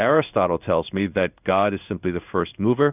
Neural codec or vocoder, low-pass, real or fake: codec, 16 kHz in and 24 kHz out, 1 kbps, XY-Tokenizer; 3.6 kHz; fake